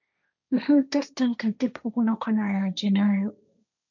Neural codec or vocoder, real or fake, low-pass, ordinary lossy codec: codec, 16 kHz, 1.1 kbps, Voila-Tokenizer; fake; 7.2 kHz; none